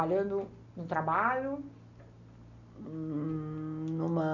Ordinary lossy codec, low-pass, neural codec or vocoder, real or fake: none; 7.2 kHz; none; real